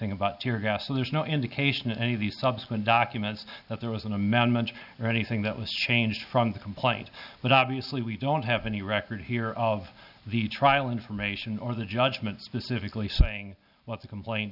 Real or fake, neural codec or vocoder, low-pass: real; none; 5.4 kHz